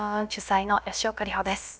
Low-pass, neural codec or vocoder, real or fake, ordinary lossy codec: none; codec, 16 kHz, about 1 kbps, DyCAST, with the encoder's durations; fake; none